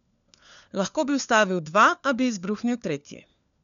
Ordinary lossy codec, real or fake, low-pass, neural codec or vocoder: none; fake; 7.2 kHz; codec, 16 kHz, 4 kbps, FunCodec, trained on LibriTTS, 50 frames a second